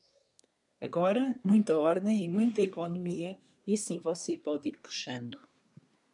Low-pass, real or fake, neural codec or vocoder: 10.8 kHz; fake; codec, 24 kHz, 1 kbps, SNAC